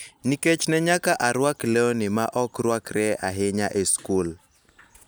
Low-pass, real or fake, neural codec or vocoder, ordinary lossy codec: none; real; none; none